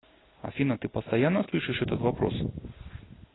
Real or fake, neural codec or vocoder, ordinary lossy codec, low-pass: real; none; AAC, 16 kbps; 7.2 kHz